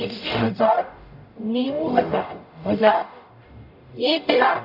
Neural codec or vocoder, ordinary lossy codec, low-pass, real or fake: codec, 44.1 kHz, 0.9 kbps, DAC; none; 5.4 kHz; fake